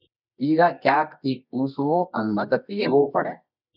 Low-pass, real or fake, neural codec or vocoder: 5.4 kHz; fake; codec, 24 kHz, 0.9 kbps, WavTokenizer, medium music audio release